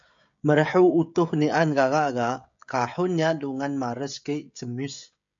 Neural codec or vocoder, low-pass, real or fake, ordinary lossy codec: codec, 16 kHz, 16 kbps, FreqCodec, smaller model; 7.2 kHz; fake; MP3, 64 kbps